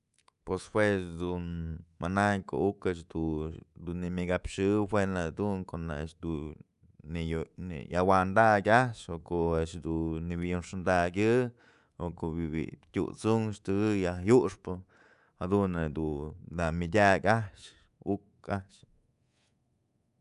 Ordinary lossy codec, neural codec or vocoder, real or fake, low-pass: none; codec, 24 kHz, 3.1 kbps, DualCodec; fake; 10.8 kHz